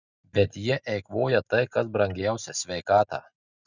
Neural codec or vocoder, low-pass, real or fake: none; 7.2 kHz; real